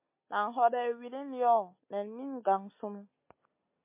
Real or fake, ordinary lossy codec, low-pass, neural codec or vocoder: real; MP3, 24 kbps; 3.6 kHz; none